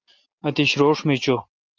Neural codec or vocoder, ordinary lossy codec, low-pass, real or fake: none; Opus, 32 kbps; 7.2 kHz; real